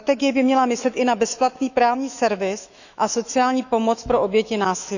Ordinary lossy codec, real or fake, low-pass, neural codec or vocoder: none; fake; 7.2 kHz; autoencoder, 48 kHz, 128 numbers a frame, DAC-VAE, trained on Japanese speech